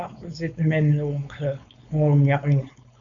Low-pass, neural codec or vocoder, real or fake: 7.2 kHz; codec, 16 kHz, 4.8 kbps, FACodec; fake